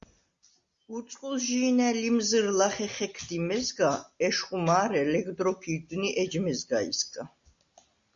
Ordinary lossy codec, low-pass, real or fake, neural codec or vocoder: Opus, 64 kbps; 7.2 kHz; real; none